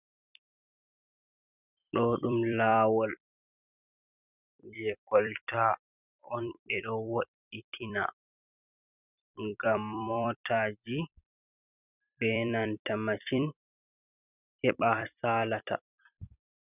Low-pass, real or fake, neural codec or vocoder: 3.6 kHz; fake; vocoder, 44.1 kHz, 128 mel bands every 256 samples, BigVGAN v2